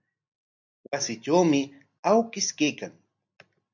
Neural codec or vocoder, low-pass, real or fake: none; 7.2 kHz; real